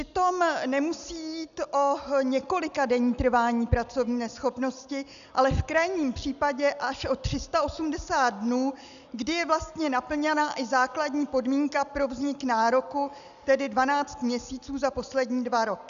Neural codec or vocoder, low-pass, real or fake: none; 7.2 kHz; real